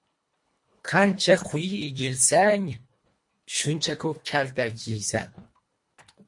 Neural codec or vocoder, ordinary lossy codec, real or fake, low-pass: codec, 24 kHz, 1.5 kbps, HILCodec; MP3, 48 kbps; fake; 10.8 kHz